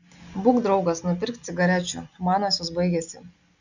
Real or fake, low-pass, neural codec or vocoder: real; 7.2 kHz; none